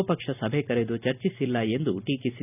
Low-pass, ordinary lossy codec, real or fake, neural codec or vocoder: 3.6 kHz; none; real; none